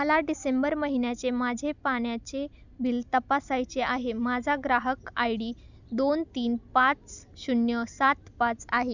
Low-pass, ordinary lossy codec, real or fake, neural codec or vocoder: 7.2 kHz; none; real; none